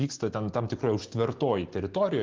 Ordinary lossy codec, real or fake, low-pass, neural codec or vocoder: Opus, 16 kbps; real; 7.2 kHz; none